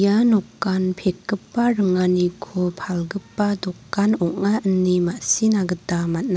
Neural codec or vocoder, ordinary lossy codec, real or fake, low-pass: none; none; real; none